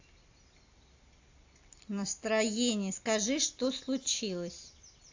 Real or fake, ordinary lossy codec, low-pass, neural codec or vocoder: real; AAC, 48 kbps; 7.2 kHz; none